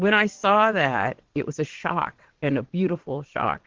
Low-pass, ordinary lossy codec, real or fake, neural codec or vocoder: 7.2 kHz; Opus, 16 kbps; fake; vocoder, 22.05 kHz, 80 mel bands, WaveNeXt